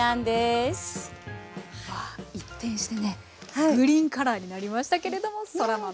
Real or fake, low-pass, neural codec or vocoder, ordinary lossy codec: real; none; none; none